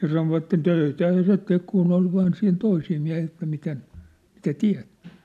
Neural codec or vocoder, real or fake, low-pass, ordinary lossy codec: none; real; 14.4 kHz; none